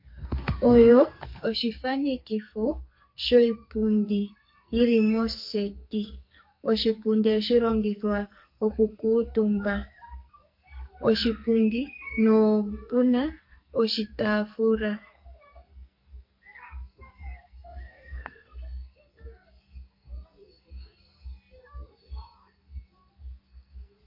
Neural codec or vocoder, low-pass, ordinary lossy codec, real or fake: codec, 44.1 kHz, 2.6 kbps, SNAC; 5.4 kHz; MP3, 32 kbps; fake